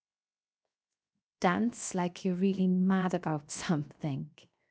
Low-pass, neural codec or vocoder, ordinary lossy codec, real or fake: none; codec, 16 kHz, 0.7 kbps, FocalCodec; none; fake